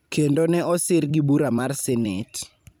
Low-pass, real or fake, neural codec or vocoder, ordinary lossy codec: none; fake; vocoder, 44.1 kHz, 128 mel bands every 256 samples, BigVGAN v2; none